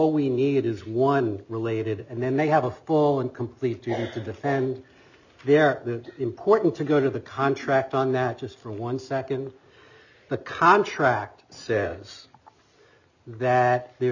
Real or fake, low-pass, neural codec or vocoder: real; 7.2 kHz; none